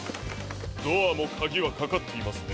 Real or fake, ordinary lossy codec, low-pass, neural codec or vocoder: real; none; none; none